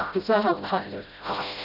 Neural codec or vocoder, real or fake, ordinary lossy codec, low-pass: codec, 16 kHz, 0.5 kbps, FreqCodec, smaller model; fake; none; 5.4 kHz